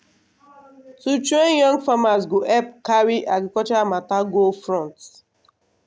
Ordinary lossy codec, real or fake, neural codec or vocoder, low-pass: none; real; none; none